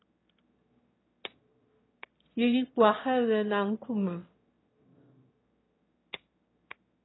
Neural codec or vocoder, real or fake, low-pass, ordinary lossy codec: autoencoder, 22.05 kHz, a latent of 192 numbers a frame, VITS, trained on one speaker; fake; 7.2 kHz; AAC, 16 kbps